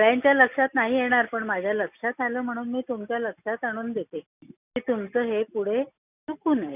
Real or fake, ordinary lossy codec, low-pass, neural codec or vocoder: real; none; 3.6 kHz; none